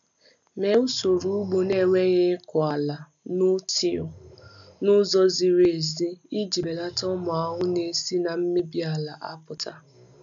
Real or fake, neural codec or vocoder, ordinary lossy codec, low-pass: real; none; none; 7.2 kHz